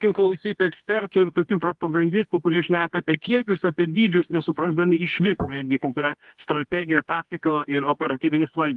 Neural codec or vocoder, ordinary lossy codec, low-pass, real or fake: codec, 24 kHz, 0.9 kbps, WavTokenizer, medium music audio release; Opus, 24 kbps; 10.8 kHz; fake